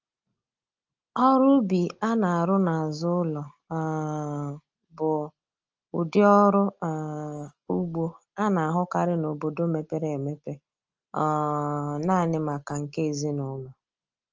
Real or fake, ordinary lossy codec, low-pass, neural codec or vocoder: real; Opus, 24 kbps; 7.2 kHz; none